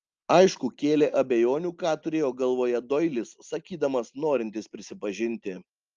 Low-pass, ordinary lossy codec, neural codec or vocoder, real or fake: 7.2 kHz; Opus, 24 kbps; none; real